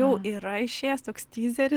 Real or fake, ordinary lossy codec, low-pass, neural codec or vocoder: real; Opus, 16 kbps; 19.8 kHz; none